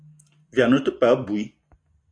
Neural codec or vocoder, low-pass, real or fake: none; 9.9 kHz; real